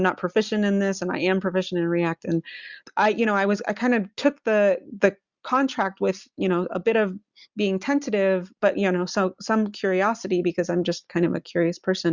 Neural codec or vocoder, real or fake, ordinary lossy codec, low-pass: none; real; Opus, 64 kbps; 7.2 kHz